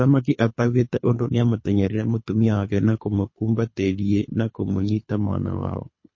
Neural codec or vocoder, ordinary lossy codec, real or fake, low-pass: codec, 24 kHz, 3 kbps, HILCodec; MP3, 32 kbps; fake; 7.2 kHz